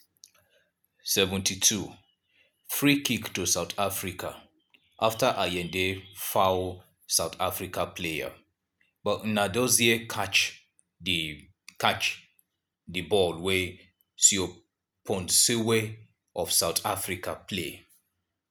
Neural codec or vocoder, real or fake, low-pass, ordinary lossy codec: none; real; none; none